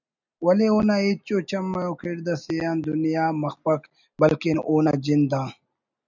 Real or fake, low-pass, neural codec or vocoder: real; 7.2 kHz; none